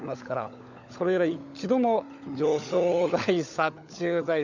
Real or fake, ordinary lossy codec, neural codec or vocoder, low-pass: fake; none; codec, 16 kHz, 16 kbps, FunCodec, trained on LibriTTS, 50 frames a second; 7.2 kHz